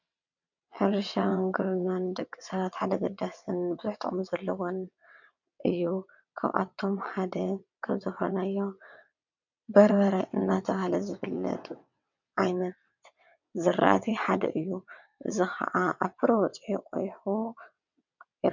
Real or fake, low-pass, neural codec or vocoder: fake; 7.2 kHz; vocoder, 22.05 kHz, 80 mel bands, WaveNeXt